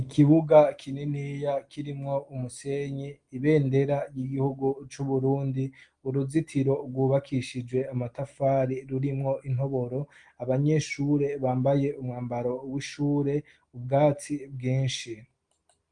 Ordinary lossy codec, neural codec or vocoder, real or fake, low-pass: Opus, 24 kbps; none; real; 9.9 kHz